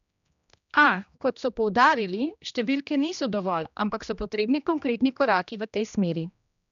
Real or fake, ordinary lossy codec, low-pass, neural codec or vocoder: fake; none; 7.2 kHz; codec, 16 kHz, 1 kbps, X-Codec, HuBERT features, trained on general audio